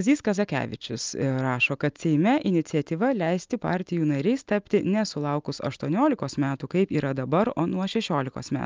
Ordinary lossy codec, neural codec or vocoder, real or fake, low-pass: Opus, 24 kbps; none; real; 7.2 kHz